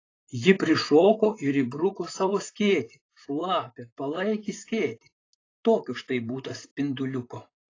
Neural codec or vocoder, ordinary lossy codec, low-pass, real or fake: vocoder, 44.1 kHz, 128 mel bands, Pupu-Vocoder; AAC, 32 kbps; 7.2 kHz; fake